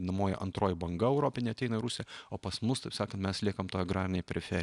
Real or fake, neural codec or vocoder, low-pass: real; none; 10.8 kHz